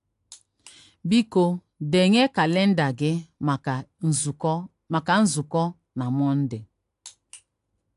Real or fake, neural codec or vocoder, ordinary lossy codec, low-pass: real; none; AAC, 64 kbps; 10.8 kHz